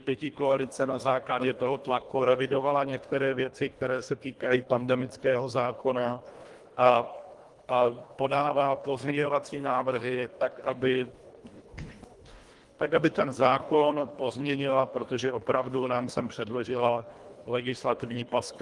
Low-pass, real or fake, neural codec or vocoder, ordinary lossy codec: 10.8 kHz; fake; codec, 24 kHz, 1.5 kbps, HILCodec; Opus, 24 kbps